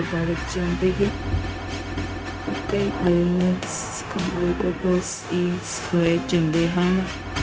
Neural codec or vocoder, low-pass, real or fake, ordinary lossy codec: codec, 16 kHz, 0.4 kbps, LongCat-Audio-Codec; none; fake; none